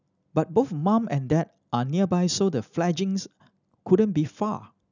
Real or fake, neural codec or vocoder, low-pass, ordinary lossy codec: real; none; 7.2 kHz; none